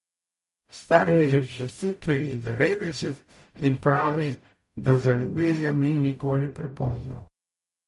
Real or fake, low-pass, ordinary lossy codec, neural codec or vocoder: fake; 14.4 kHz; MP3, 48 kbps; codec, 44.1 kHz, 0.9 kbps, DAC